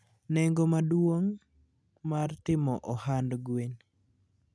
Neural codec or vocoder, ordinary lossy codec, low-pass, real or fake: none; none; none; real